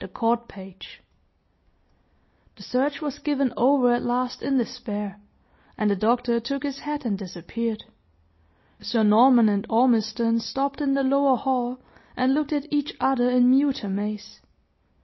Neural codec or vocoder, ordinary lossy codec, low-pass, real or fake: none; MP3, 24 kbps; 7.2 kHz; real